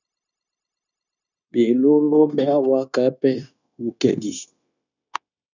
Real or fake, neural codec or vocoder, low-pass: fake; codec, 16 kHz, 0.9 kbps, LongCat-Audio-Codec; 7.2 kHz